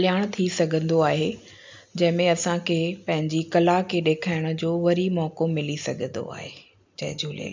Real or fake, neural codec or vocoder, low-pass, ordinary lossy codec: real; none; 7.2 kHz; MP3, 64 kbps